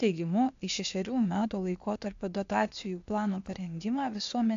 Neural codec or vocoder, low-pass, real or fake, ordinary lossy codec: codec, 16 kHz, 0.8 kbps, ZipCodec; 7.2 kHz; fake; AAC, 64 kbps